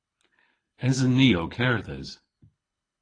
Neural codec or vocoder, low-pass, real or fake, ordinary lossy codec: codec, 24 kHz, 6 kbps, HILCodec; 9.9 kHz; fake; AAC, 32 kbps